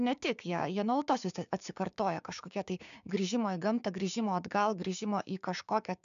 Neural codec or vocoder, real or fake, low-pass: codec, 16 kHz, 6 kbps, DAC; fake; 7.2 kHz